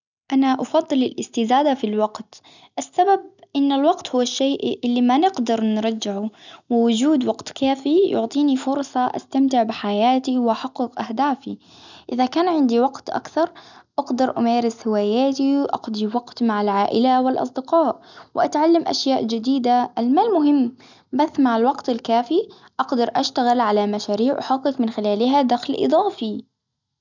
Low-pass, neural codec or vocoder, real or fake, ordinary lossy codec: 7.2 kHz; none; real; none